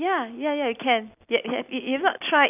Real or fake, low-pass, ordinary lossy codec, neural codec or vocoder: real; 3.6 kHz; none; none